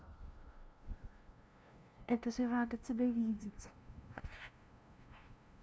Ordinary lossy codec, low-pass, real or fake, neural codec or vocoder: none; none; fake; codec, 16 kHz, 0.5 kbps, FunCodec, trained on LibriTTS, 25 frames a second